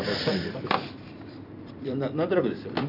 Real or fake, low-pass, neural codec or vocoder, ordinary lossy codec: fake; 5.4 kHz; vocoder, 44.1 kHz, 128 mel bands every 256 samples, BigVGAN v2; none